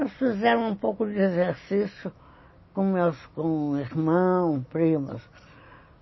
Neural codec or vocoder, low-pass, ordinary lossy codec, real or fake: none; 7.2 kHz; MP3, 24 kbps; real